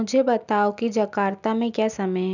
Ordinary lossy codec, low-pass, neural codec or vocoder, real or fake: none; 7.2 kHz; none; real